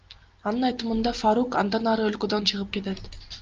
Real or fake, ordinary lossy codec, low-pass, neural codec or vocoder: real; Opus, 24 kbps; 7.2 kHz; none